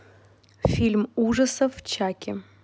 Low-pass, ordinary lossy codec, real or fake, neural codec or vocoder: none; none; real; none